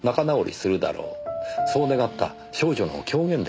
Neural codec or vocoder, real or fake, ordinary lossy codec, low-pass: none; real; none; none